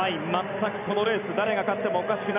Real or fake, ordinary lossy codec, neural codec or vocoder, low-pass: real; none; none; 3.6 kHz